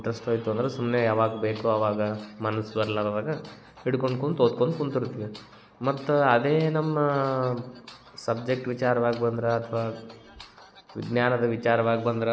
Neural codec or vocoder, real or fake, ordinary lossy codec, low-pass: none; real; none; none